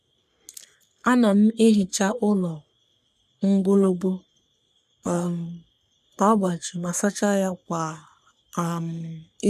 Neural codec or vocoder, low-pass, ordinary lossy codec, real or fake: codec, 44.1 kHz, 3.4 kbps, Pupu-Codec; 14.4 kHz; none; fake